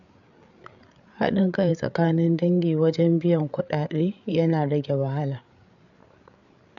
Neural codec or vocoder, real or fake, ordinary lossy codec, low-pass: codec, 16 kHz, 8 kbps, FreqCodec, larger model; fake; none; 7.2 kHz